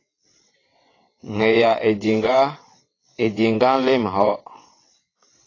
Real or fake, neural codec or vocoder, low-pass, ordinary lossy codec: fake; vocoder, 22.05 kHz, 80 mel bands, WaveNeXt; 7.2 kHz; AAC, 32 kbps